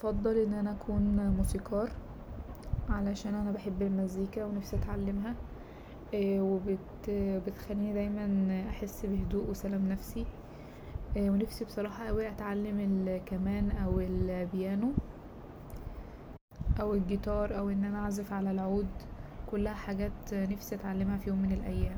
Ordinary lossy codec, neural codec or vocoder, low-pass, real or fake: none; none; none; real